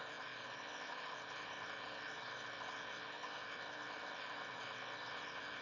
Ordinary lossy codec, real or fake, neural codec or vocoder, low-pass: none; fake; autoencoder, 22.05 kHz, a latent of 192 numbers a frame, VITS, trained on one speaker; 7.2 kHz